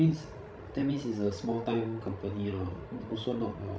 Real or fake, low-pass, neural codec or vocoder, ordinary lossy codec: fake; none; codec, 16 kHz, 16 kbps, FreqCodec, larger model; none